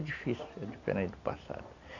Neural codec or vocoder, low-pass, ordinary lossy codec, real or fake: none; 7.2 kHz; none; real